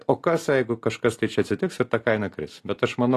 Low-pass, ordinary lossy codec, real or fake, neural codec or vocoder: 14.4 kHz; AAC, 48 kbps; real; none